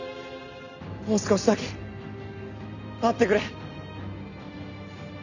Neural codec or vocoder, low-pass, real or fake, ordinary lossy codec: none; 7.2 kHz; real; none